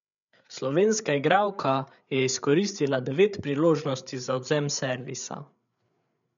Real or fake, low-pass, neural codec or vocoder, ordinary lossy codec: fake; 7.2 kHz; codec, 16 kHz, 8 kbps, FreqCodec, larger model; none